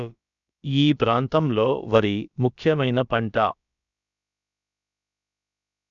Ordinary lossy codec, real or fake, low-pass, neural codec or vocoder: none; fake; 7.2 kHz; codec, 16 kHz, about 1 kbps, DyCAST, with the encoder's durations